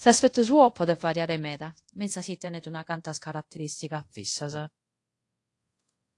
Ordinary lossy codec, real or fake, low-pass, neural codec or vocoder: AAC, 48 kbps; fake; 10.8 kHz; codec, 24 kHz, 0.5 kbps, DualCodec